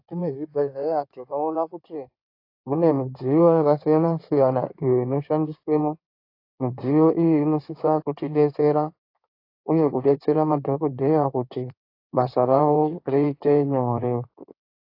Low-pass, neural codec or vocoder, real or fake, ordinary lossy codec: 5.4 kHz; codec, 16 kHz in and 24 kHz out, 2.2 kbps, FireRedTTS-2 codec; fake; AAC, 32 kbps